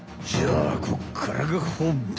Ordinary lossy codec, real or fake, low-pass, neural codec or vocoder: none; real; none; none